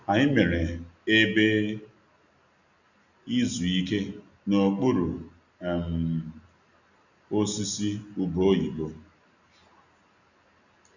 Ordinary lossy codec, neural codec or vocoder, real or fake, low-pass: none; none; real; 7.2 kHz